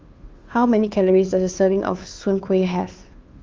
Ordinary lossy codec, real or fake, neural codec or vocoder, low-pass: Opus, 32 kbps; fake; codec, 16 kHz, 2 kbps, FunCodec, trained on Chinese and English, 25 frames a second; 7.2 kHz